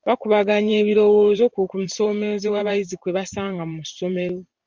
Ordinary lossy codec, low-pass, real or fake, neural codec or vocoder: Opus, 24 kbps; 7.2 kHz; fake; vocoder, 44.1 kHz, 128 mel bands every 512 samples, BigVGAN v2